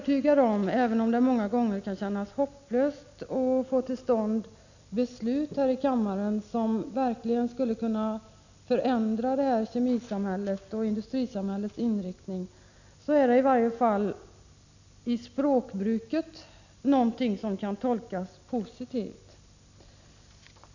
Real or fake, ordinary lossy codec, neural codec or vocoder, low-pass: real; none; none; 7.2 kHz